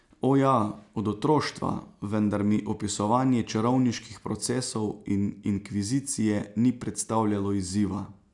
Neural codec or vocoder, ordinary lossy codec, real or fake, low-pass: none; none; real; 10.8 kHz